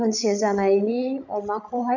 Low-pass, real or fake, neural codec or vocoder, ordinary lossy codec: 7.2 kHz; fake; codec, 16 kHz, 16 kbps, FreqCodec, larger model; none